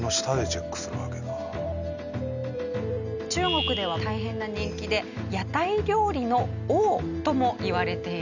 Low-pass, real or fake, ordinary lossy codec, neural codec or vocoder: 7.2 kHz; real; none; none